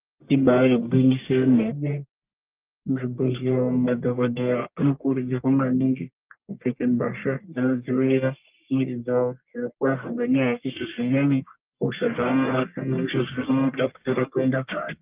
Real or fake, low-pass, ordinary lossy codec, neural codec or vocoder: fake; 3.6 kHz; Opus, 32 kbps; codec, 44.1 kHz, 1.7 kbps, Pupu-Codec